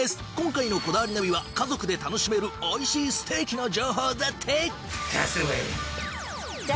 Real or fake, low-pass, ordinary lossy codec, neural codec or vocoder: real; none; none; none